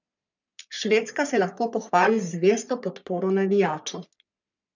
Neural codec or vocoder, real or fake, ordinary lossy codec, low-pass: codec, 44.1 kHz, 3.4 kbps, Pupu-Codec; fake; none; 7.2 kHz